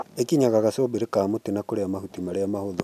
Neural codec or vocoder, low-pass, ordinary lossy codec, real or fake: none; 14.4 kHz; none; real